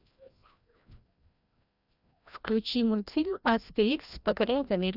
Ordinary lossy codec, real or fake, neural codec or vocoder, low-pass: none; fake; codec, 16 kHz, 1 kbps, FreqCodec, larger model; 5.4 kHz